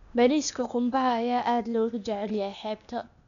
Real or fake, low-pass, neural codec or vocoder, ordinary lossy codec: fake; 7.2 kHz; codec, 16 kHz, 0.8 kbps, ZipCodec; none